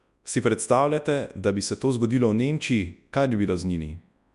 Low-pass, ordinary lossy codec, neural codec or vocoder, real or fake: 10.8 kHz; none; codec, 24 kHz, 0.9 kbps, WavTokenizer, large speech release; fake